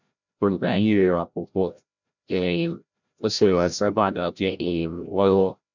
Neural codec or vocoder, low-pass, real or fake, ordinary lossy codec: codec, 16 kHz, 0.5 kbps, FreqCodec, larger model; 7.2 kHz; fake; none